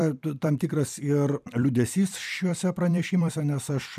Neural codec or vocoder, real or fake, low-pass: vocoder, 44.1 kHz, 128 mel bands every 256 samples, BigVGAN v2; fake; 14.4 kHz